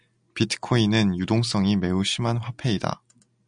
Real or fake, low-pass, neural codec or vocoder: real; 9.9 kHz; none